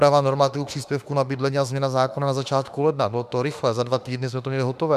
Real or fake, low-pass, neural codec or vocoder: fake; 14.4 kHz; autoencoder, 48 kHz, 32 numbers a frame, DAC-VAE, trained on Japanese speech